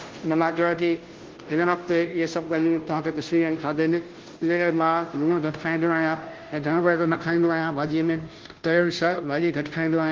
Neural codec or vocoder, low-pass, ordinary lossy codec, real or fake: codec, 16 kHz, 0.5 kbps, FunCodec, trained on Chinese and English, 25 frames a second; 7.2 kHz; Opus, 16 kbps; fake